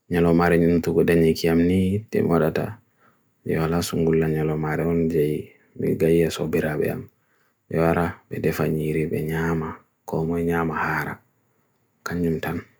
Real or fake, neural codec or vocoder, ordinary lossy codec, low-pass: real; none; none; none